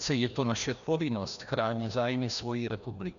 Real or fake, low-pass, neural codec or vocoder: fake; 7.2 kHz; codec, 16 kHz, 1 kbps, FreqCodec, larger model